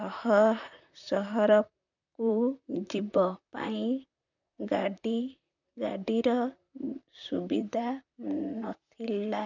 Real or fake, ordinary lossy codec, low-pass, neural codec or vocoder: fake; none; 7.2 kHz; vocoder, 44.1 kHz, 128 mel bands, Pupu-Vocoder